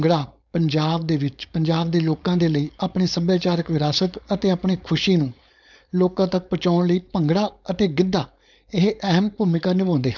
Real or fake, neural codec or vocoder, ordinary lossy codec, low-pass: fake; codec, 16 kHz, 4.8 kbps, FACodec; none; 7.2 kHz